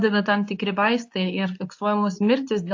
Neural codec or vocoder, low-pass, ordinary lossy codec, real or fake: codec, 24 kHz, 0.9 kbps, WavTokenizer, medium speech release version 2; 7.2 kHz; AAC, 48 kbps; fake